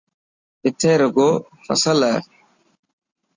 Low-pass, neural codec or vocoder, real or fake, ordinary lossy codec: 7.2 kHz; none; real; Opus, 64 kbps